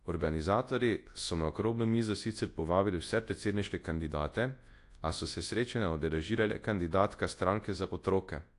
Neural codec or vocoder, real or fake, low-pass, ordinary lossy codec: codec, 24 kHz, 0.9 kbps, WavTokenizer, large speech release; fake; 10.8 kHz; AAC, 48 kbps